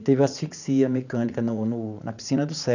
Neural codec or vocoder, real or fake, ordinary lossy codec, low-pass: vocoder, 44.1 kHz, 80 mel bands, Vocos; fake; none; 7.2 kHz